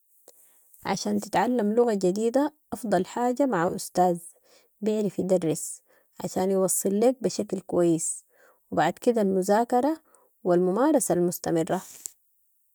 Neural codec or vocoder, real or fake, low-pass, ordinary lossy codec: none; real; none; none